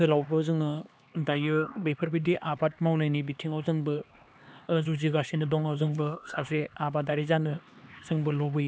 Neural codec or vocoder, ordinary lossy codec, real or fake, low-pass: codec, 16 kHz, 2 kbps, X-Codec, HuBERT features, trained on LibriSpeech; none; fake; none